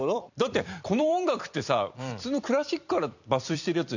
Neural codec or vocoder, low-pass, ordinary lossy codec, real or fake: vocoder, 44.1 kHz, 128 mel bands every 256 samples, BigVGAN v2; 7.2 kHz; none; fake